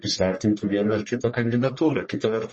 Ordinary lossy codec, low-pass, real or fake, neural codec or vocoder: MP3, 32 kbps; 10.8 kHz; fake; codec, 44.1 kHz, 1.7 kbps, Pupu-Codec